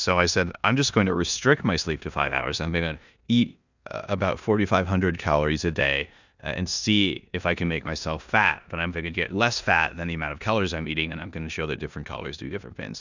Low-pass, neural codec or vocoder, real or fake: 7.2 kHz; codec, 16 kHz in and 24 kHz out, 0.9 kbps, LongCat-Audio-Codec, four codebook decoder; fake